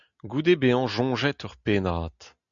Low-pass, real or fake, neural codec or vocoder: 7.2 kHz; real; none